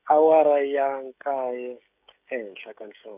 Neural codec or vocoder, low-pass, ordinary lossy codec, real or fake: codec, 16 kHz, 16 kbps, FreqCodec, smaller model; 3.6 kHz; none; fake